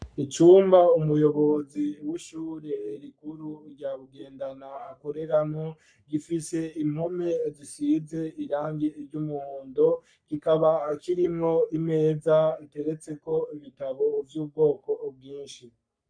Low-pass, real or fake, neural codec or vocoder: 9.9 kHz; fake; codec, 44.1 kHz, 3.4 kbps, Pupu-Codec